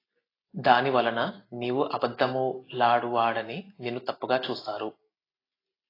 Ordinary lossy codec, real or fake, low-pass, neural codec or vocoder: AAC, 24 kbps; real; 5.4 kHz; none